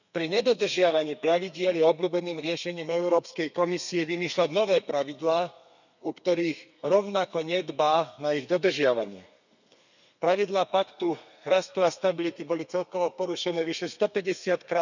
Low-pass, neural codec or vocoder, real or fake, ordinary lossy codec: 7.2 kHz; codec, 32 kHz, 1.9 kbps, SNAC; fake; none